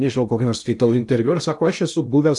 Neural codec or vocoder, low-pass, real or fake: codec, 16 kHz in and 24 kHz out, 0.8 kbps, FocalCodec, streaming, 65536 codes; 10.8 kHz; fake